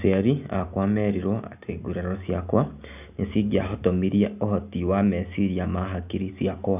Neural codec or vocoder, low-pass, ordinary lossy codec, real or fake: none; 3.6 kHz; none; real